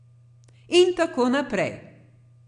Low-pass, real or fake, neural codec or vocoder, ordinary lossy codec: 9.9 kHz; real; none; AAC, 64 kbps